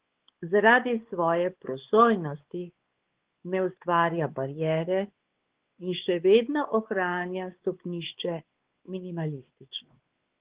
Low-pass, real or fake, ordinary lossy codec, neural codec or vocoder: 3.6 kHz; fake; Opus, 16 kbps; codec, 16 kHz, 4 kbps, X-Codec, WavLM features, trained on Multilingual LibriSpeech